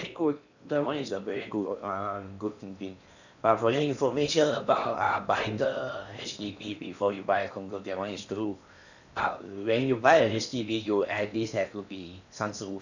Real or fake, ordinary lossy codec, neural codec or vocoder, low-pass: fake; none; codec, 16 kHz in and 24 kHz out, 0.8 kbps, FocalCodec, streaming, 65536 codes; 7.2 kHz